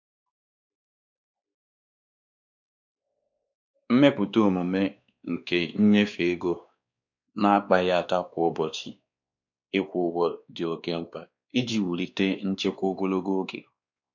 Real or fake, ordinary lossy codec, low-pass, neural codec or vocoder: fake; none; 7.2 kHz; codec, 16 kHz, 2 kbps, X-Codec, WavLM features, trained on Multilingual LibriSpeech